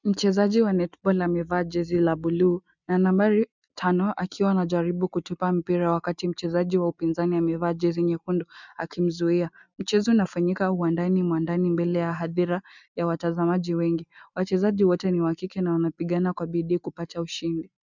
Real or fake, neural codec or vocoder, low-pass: real; none; 7.2 kHz